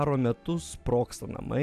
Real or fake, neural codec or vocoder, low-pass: real; none; 14.4 kHz